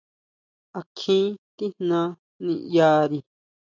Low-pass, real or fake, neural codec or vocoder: 7.2 kHz; real; none